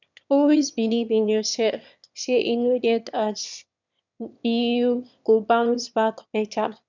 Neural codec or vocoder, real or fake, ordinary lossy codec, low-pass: autoencoder, 22.05 kHz, a latent of 192 numbers a frame, VITS, trained on one speaker; fake; none; 7.2 kHz